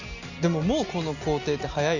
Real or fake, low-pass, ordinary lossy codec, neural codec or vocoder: real; 7.2 kHz; none; none